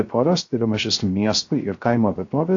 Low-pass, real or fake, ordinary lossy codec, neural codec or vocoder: 7.2 kHz; fake; AAC, 48 kbps; codec, 16 kHz, 0.3 kbps, FocalCodec